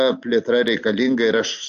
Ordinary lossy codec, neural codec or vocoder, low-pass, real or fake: AAC, 64 kbps; none; 7.2 kHz; real